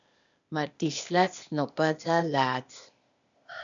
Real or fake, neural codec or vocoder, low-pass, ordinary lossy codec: fake; codec, 16 kHz, 0.8 kbps, ZipCodec; 7.2 kHz; MP3, 96 kbps